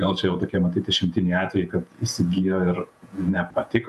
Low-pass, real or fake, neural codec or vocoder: 14.4 kHz; fake; vocoder, 48 kHz, 128 mel bands, Vocos